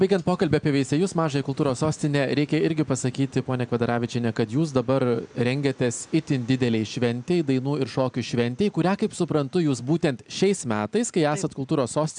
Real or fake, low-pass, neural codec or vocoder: real; 9.9 kHz; none